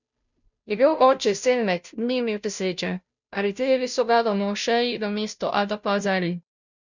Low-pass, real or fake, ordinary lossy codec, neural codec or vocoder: 7.2 kHz; fake; none; codec, 16 kHz, 0.5 kbps, FunCodec, trained on Chinese and English, 25 frames a second